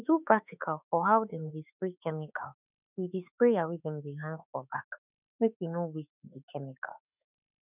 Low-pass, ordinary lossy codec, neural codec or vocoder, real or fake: 3.6 kHz; none; autoencoder, 48 kHz, 32 numbers a frame, DAC-VAE, trained on Japanese speech; fake